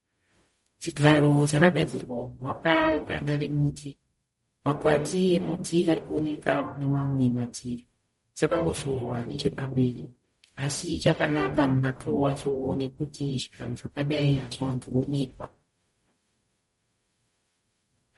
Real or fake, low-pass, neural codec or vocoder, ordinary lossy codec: fake; 19.8 kHz; codec, 44.1 kHz, 0.9 kbps, DAC; MP3, 48 kbps